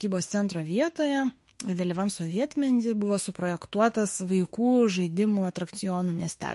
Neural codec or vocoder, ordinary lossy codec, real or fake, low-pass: autoencoder, 48 kHz, 32 numbers a frame, DAC-VAE, trained on Japanese speech; MP3, 48 kbps; fake; 14.4 kHz